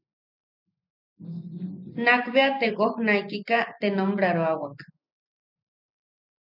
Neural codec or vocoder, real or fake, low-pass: none; real; 5.4 kHz